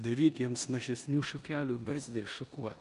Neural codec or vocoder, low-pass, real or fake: codec, 16 kHz in and 24 kHz out, 0.9 kbps, LongCat-Audio-Codec, four codebook decoder; 10.8 kHz; fake